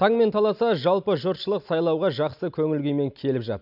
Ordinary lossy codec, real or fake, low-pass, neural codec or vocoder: none; real; 5.4 kHz; none